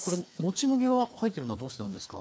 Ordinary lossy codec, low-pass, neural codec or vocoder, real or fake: none; none; codec, 16 kHz, 2 kbps, FreqCodec, larger model; fake